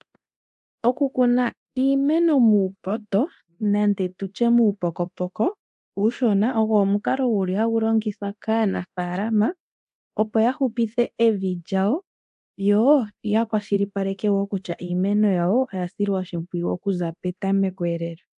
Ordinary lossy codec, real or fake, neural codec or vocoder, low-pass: AAC, 96 kbps; fake; codec, 24 kHz, 0.9 kbps, DualCodec; 10.8 kHz